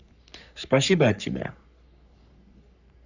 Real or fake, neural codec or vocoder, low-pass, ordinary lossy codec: fake; codec, 44.1 kHz, 3.4 kbps, Pupu-Codec; 7.2 kHz; none